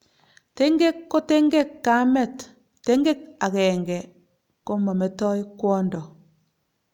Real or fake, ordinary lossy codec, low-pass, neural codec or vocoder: real; none; 19.8 kHz; none